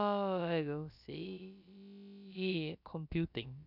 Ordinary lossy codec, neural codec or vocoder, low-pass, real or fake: none; codec, 16 kHz, about 1 kbps, DyCAST, with the encoder's durations; 5.4 kHz; fake